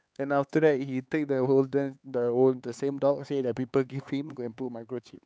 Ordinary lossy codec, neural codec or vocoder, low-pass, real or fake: none; codec, 16 kHz, 4 kbps, X-Codec, HuBERT features, trained on LibriSpeech; none; fake